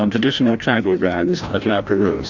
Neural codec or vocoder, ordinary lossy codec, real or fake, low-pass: codec, 16 kHz, 1 kbps, FreqCodec, larger model; Opus, 64 kbps; fake; 7.2 kHz